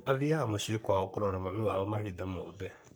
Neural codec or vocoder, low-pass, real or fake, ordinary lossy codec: codec, 44.1 kHz, 3.4 kbps, Pupu-Codec; none; fake; none